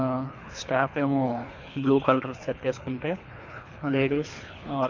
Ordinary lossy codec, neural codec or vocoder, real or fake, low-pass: MP3, 48 kbps; codec, 24 kHz, 3 kbps, HILCodec; fake; 7.2 kHz